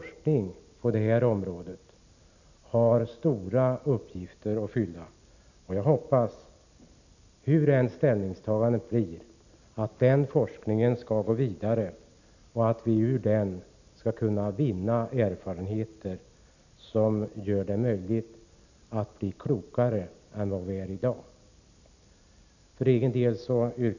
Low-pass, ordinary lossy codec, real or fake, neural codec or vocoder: 7.2 kHz; none; real; none